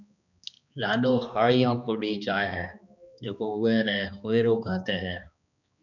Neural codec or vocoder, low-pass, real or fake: codec, 16 kHz, 2 kbps, X-Codec, HuBERT features, trained on balanced general audio; 7.2 kHz; fake